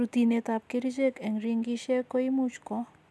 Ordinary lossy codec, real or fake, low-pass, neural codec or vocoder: none; real; none; none